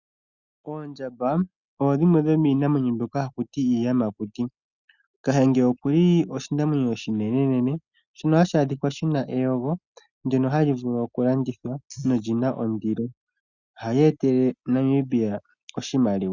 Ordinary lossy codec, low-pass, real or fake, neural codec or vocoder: Opus, 64 kbps; 7.2 kHz; real; none